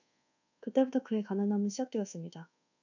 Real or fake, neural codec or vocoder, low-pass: fake; codec, 24 kHz, 1.2 kbps, DualCodec; 7.2 kHz